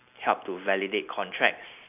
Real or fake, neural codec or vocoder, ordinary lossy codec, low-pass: real; none; none; 3.6 kHz